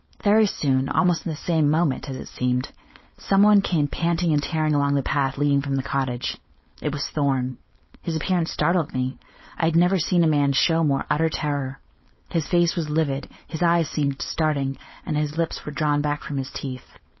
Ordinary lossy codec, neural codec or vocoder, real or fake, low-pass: MP3, 24 kbps; codec, 16 kHz, 4.8 kbps, FACodec; fake; 7.2 kHz